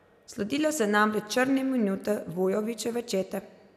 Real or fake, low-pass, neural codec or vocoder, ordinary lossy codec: real; 14.4 kHz; none; none